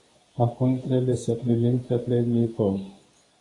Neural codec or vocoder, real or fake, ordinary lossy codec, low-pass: codec, 24 kHz, 0.9 kbps, WavTokenizer, medium speech release version 2; fake; AAC, 32 kbps; 10.8 kHz